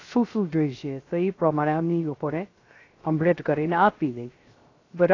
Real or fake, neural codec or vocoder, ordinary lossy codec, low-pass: fake; codec, 16 kHz, 0.3 kbps, FocalCodec; AAC, 32 kbps; 7.2 kHz